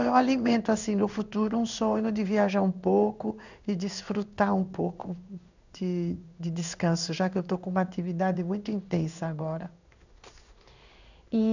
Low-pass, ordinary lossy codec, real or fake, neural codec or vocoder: 7.2 kHz; none; fake; codec, 16 kHz in and 24 kHz out, 1 kbps, XY-Tokenizer